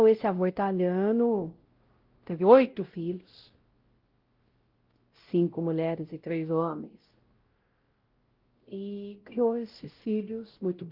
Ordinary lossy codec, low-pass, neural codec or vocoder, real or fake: Opus, 16 kbps; 5.4 kHz; codec, 16 kHz, 0.5 kbps, X-Codec, WavLM features, trained on Multilingual LibriSpeech; fake